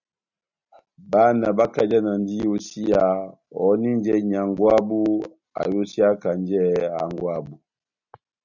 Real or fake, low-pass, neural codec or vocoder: real; 7.2 kHz; none